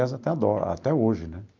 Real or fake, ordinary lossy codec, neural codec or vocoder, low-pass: real; Opus, 24 kbps; none; 7.2 kHz